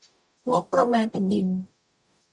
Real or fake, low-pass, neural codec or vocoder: fake; 10.8 kHz; codec, 44.1 kHz, 0.9 kbps, DAC